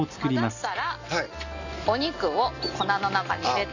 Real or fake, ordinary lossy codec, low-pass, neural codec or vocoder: real; none; 7.2 kHz; none